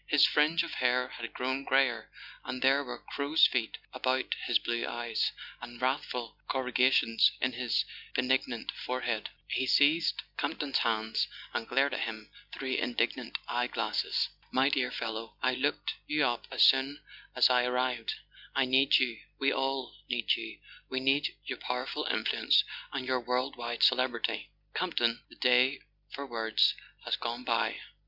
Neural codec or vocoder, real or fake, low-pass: none; real; 5.4 kHz